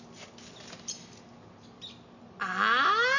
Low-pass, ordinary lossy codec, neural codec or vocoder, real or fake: 7.2 kHz; none; none; real